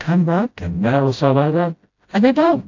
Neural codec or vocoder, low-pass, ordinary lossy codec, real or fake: codec, 16 kHz, 0.5 kbps, FreqCodec, smaller model; 7.2 kHz; AAC, 48 kbps; fake